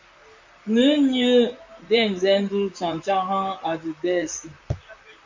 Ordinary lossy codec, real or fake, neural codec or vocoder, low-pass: MP3, 48 kbps; fake; vocoder, 44.1 kHz, 128 mel bands, Pupu-Vocoder; 7.2 kHz